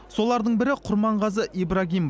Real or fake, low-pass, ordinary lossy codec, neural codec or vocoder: real; none; none; none